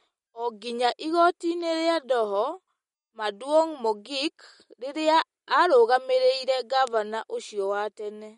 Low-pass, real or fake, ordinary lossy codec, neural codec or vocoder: 19.8 kHz; real; MP3, 48 kbps; none